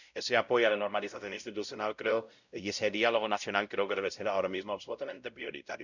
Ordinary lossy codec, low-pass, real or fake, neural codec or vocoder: none; 7.2 kHz; fake; codec, 16 kHz, 0.5 kbps, X-Codec, WavLM features, trained on Multilingual LibriSpeech